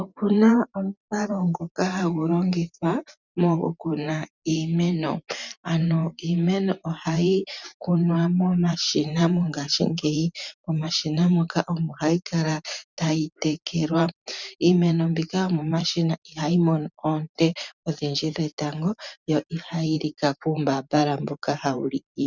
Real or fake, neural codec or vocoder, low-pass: fake; vocoder, 44.1 kHz, 128 mel bands every 512 samples, BigVGAN v2; 7.2 kHz